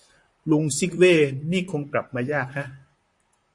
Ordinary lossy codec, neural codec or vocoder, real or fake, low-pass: MP3, 48 kbps; vocoder, 44.1 kHz, 128 mel bands, Pupu-Vocoder; fake; 10.8 kHz